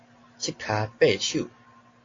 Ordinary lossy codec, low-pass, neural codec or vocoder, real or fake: AAC, 32 kbps; 7.2 kHz; none; real